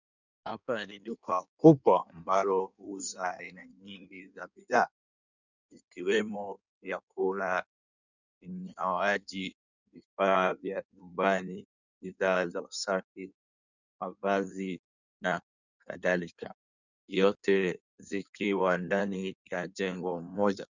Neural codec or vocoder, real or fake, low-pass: codec, 16 kHz in and 24 kHz out, 1.1 kbps, FireRedTTS-2 codec; fake; 7.2 kHz